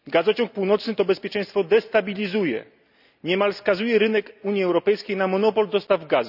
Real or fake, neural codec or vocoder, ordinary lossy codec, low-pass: real; none; none; 5.4 kHz